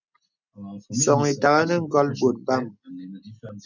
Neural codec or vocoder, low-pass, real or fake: none; 7.2 kHz; real